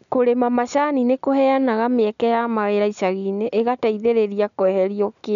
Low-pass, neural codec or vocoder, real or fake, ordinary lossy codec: 7.2 kHz; none; real; none